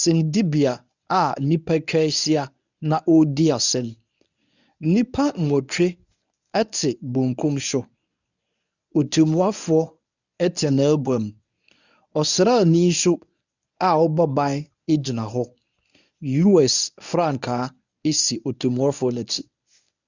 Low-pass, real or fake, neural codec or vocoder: 7.2 kHz; fake; codec, 24 kHz, 0.9 kbps, WavTokenizer, medium speech release version 1